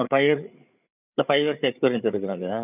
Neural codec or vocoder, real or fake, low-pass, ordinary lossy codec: codec, 16 kHz, 8 kbps, FreqCodec, larger model; fake; 3.6 kHz; none